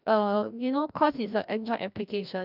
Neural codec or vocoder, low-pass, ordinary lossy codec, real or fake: codec, 16 kHz, 1 kbps, FreqCodec, larger model; 5.4 kHz; none; fake